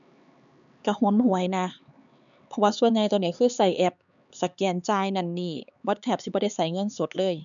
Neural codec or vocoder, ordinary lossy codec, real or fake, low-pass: codec, 16 kHz, 4 kbps, X-Codec, HuBERT features, trained on LibriSpeech; MP3, 96 kbps; fake; 7.2 kHz